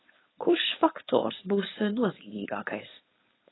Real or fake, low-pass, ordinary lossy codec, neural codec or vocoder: fake; 7.2 kHz; AAC, 16 kbps; codec, 16 kHz, 4.8 kbps, FACodec